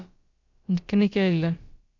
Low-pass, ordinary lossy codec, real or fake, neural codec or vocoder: 7.2 kHz; Opus, 64 kbps; fake; codec, 16 kHz, about 1 kbps, DyCAST, with the encoder's durations